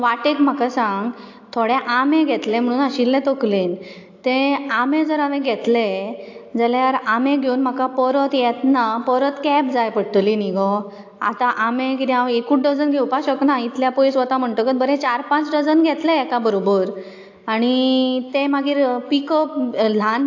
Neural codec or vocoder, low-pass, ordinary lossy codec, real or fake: none; 7.2 kHz; AAC, 48 kbps; real